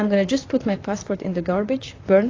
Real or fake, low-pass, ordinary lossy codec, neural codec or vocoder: fake; 7.2 kHz; AAC, 32 kbps; vocoder, 44.1 kHz, 128 mel bands, Pupu-Vocoder